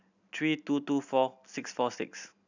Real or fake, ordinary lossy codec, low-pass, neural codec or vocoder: real; none; 7.2 kHz; none